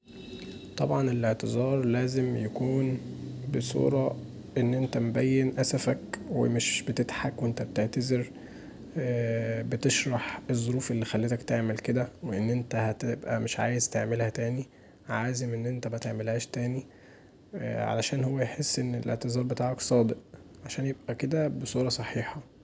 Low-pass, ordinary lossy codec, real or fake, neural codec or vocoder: none; none; real; none